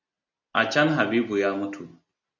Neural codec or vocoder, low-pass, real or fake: none; 7.2 kHz; real